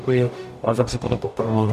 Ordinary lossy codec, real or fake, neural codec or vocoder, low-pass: MP3, 96 kbps; fake; codec, 44.1 kHz, 0.9 kbps, DAC; 14.4 kHz